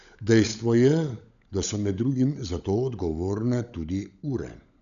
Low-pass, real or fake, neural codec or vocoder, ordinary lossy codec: 7.2 kHz; fake; codec, 16 kHz, 16 kbps, FunCodec, trained on LibriTTS, 50 frames a second; none